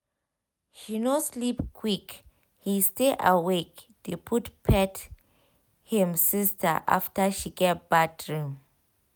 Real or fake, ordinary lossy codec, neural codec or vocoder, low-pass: real; none; none; none